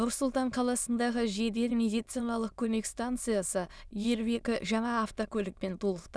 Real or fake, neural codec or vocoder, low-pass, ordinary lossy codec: fake; autoencoder, 22.05 kHz, a latent of 192 numbers a frame, VITS, trained on many speakers; none; none